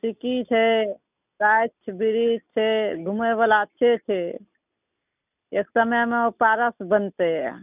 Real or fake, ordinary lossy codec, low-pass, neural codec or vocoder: real; none; 3.6 kHz; none